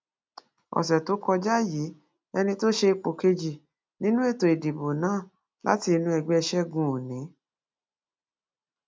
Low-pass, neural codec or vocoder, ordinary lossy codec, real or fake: none; none; none; real